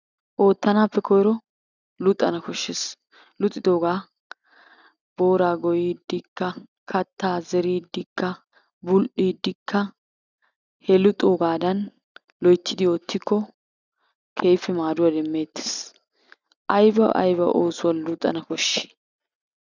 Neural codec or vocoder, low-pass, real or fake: none; 7.2 kHz; real